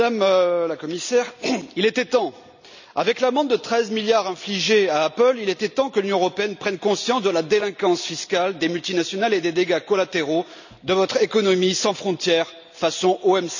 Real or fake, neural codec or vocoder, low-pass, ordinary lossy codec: real; none; 7.2 kHz; none